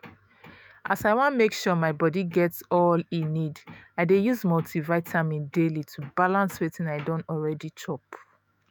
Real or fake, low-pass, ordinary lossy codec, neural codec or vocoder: fake; none; none; autoencoder, 48 kHz, 128 numbers a frame, DAC-VAE, trained on Japanese speech